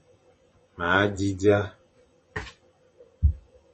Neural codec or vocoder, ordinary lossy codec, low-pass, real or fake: vocoder, 24 kHz, 100 mel bands, Vocos; MP3, 32 kbps; 10.8 kHz; fake